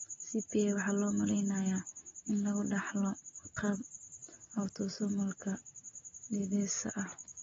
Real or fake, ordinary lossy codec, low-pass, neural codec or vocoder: real; AAC, 24 kbps; 7.2 kHz; none